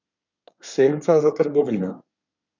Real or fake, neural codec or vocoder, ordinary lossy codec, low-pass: fake; codec, 24 kHz, 1 kbps, SNAC; none; 7.2 kHz